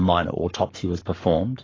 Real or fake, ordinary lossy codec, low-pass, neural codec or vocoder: fake; AAC, 32 kbps; 7.2 kHz; codec, 44.1 kHz, 7.8 kbps, Pupu-Codec